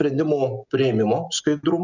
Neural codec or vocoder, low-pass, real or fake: none; 7.2 kHz; real